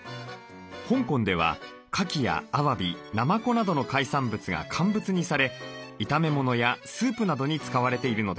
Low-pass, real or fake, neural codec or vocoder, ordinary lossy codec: none; real; none; none